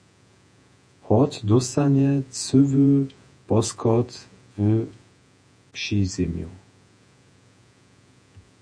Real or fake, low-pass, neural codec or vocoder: fake; 9.9 kHz; vocoder, 48 kHz, 128 mel bands, Vocos